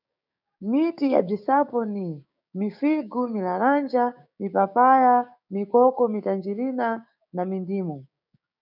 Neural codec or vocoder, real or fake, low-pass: codec, 16 kHz, 6 kbps, DAC; fake; 5.4 kHz